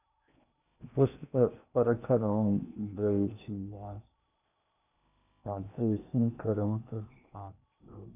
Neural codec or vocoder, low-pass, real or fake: codec, 16 kHz in and 24 kHz out, 0.8 kbps, FocalCodec, streaming, 65536 codes; 3.6 kHz; fake